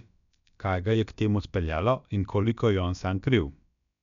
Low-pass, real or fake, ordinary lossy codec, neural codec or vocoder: 7.2 kHz; fake; none; codec, 16 kHz, about 1 kbps, DyCAST, with the encoder's durations